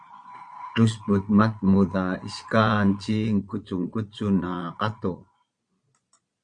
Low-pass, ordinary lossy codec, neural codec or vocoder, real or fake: 9.9 kHz; Opus, 64 kbps; vocoder, 22.05 kHz, 80 mel bands, Vocos; fake